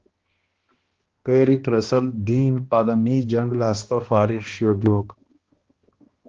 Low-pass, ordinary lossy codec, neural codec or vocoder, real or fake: 7.2 kHz; Opus, 16 kbps; codec, 16 kHz, 1 kbps, X-Codec, HuBERT features, trained on balanced general audio; fake